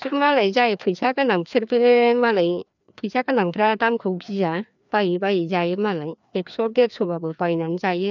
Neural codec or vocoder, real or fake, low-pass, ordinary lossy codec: codec, 16 kHz, 2 kbps, FreqCodec, larger model; fake; 7.2 kHz; none